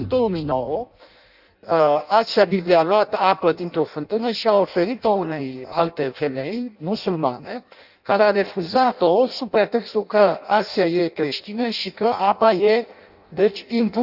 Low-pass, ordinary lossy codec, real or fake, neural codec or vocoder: 5.4 kHz; none; fake; codec, 16 kHz in and 24 kHz out, 0.6 kbps, FireRedTTS-2 codec